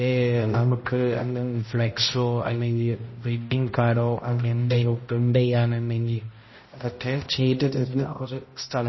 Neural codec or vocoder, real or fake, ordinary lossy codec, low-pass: codec, 16 kHz, 0.5 kbps, X-Codec, HuBERT features, trained on balanced general audio; fake; MP3, 24 kbps; 7.2 kHz